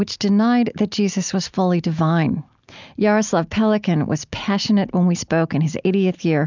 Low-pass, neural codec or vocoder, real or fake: 7.2 kHz; none; real